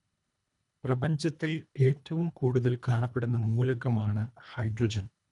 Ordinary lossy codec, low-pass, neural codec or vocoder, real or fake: none; 10.8 kHz; codec, 24 kHz, 1.5 kbps, HILCodec; fake